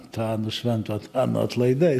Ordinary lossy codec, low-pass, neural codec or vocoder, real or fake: AAC, 96 kbps; 14.4 kHz; none; real